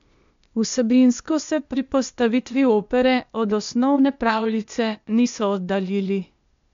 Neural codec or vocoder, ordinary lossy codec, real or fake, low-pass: codec, 16 kHz, 0.8 kbps, ZipCodec; MP3, 64 kbps; fake; 7.2 kHz